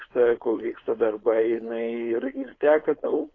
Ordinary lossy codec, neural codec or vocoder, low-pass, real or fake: AAC, 32 kbps; codec, 16 kHz, 4.8 kbps, FACodec; 7.2 kHz; fake